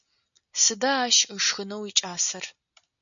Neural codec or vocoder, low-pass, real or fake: none; 7.2 kHz; real